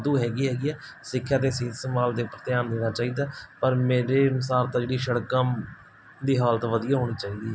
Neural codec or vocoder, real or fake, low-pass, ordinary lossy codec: none; real; none; none